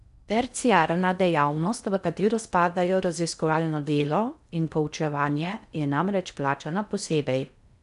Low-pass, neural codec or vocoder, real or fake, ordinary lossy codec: 10.8 kHz; codec, 16 kHz in and 24 kHz out, 0.6 kbps, FocalCodec, streaming, 4096 codes; fake; none